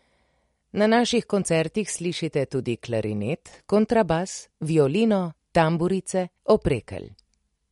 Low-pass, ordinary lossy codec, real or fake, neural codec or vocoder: 19.8 kHz; MP3, 48 kbps; real; none